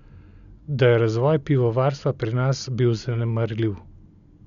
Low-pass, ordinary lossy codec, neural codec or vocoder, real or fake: 7.2 kHz; none; none; real